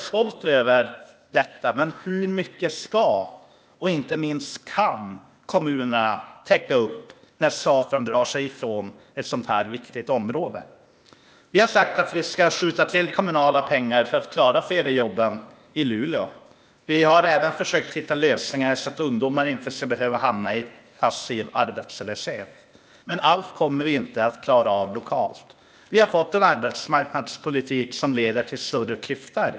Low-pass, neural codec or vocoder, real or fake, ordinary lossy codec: none; codec, 16 kHz, 0.8 kbps, ZipCodec; fake; none